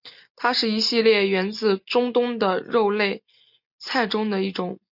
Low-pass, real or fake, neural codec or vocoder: 5.4 kHz; real; none